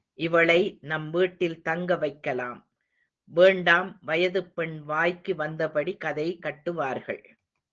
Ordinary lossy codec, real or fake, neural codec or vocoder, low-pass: Opus, 16 kbps; real; none; 7.2 kHz